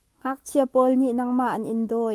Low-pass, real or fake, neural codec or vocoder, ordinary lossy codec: 14.4 kHz; fake; autoencoder, 48 kHz, 128 numbers a frame, DAC-VAE, trained on Japanese speech; Opus, 24 kbps